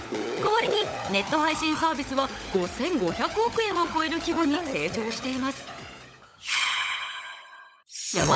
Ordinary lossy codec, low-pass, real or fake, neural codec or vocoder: none; none; fake; codec, 16 kHz, 16 kbps, FunCodec, trained on LibriTTS, 50 frames a second